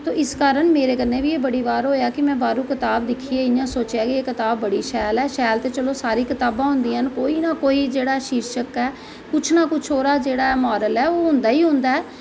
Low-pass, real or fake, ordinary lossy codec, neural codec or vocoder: none; real; none; none